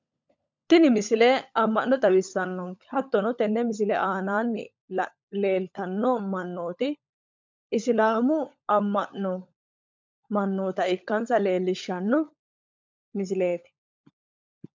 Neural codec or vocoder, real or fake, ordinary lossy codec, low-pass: codec, 16 kHz, 16 kbps, FunCodec, trained on LibriTTS, 50 frames a second; fake; MP3, 64 kbps; 7.2 kHz